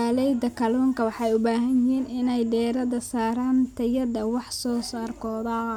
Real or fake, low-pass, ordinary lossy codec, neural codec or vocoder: real; 19.8 kHz; none; none